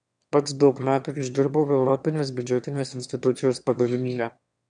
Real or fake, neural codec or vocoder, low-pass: fake; autoencoder, 22.05 kHz, a latent of 192 numbers a frame, VITS, trained on one speaker; 9.9 kHz